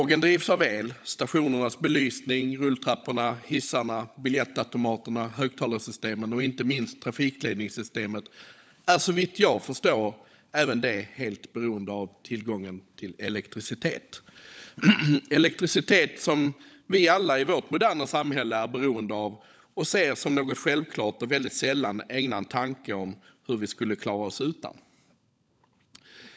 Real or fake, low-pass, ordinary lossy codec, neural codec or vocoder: fake; none; none; codec, 16 kHz, 16 kbps, FunCodec, trained on LibriTTS, 50 frames a second